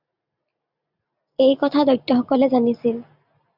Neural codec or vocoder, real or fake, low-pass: none; real; 5.4 kHz